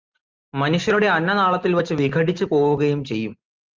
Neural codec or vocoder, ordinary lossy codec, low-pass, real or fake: none; Opus, 32 kbps; 7.2 kHz; real